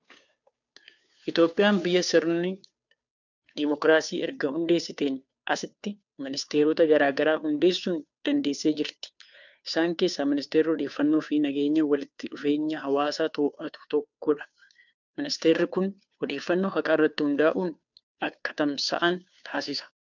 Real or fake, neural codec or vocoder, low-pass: fake; codec, 16 kHz, 2 kbps, FunCodec, trained on Chinese and English, 25 frames a second; 7.2 kHz